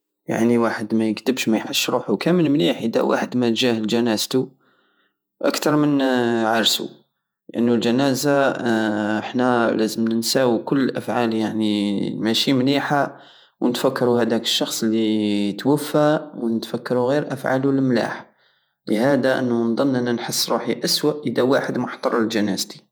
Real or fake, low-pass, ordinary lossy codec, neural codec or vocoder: fake; none; none; vocoder, 48 kHz, 128 mel bands, Vocos